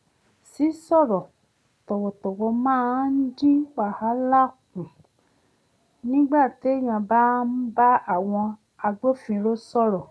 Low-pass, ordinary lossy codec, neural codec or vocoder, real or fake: none; none; none; real